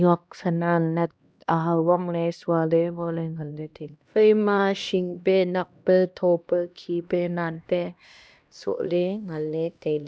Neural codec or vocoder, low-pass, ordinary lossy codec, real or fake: codec, 16 kHz, 1 kbps, X-Codec, HuBERT features, trained on LibriSpeech; none; none; fake